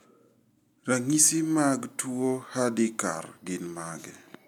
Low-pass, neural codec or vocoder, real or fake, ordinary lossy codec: 19.8 kHz; none; real; none